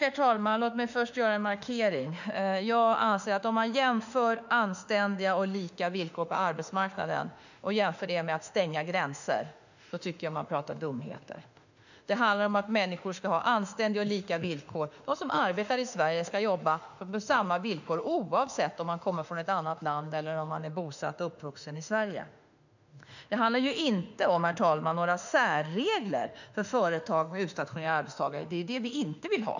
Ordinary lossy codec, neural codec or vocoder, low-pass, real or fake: none; autoencoder, 48 kHz, 32 numbers a frame, DAC-VAE, trained on Japanese speech; 7.2 kHz; fake